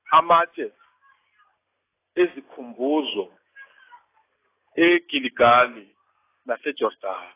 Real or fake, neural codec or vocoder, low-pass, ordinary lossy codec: real; none; 3.6 kHz; AAC, 16 kbps